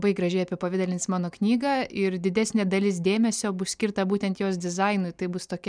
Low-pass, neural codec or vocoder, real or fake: 9.9 kHz; none; real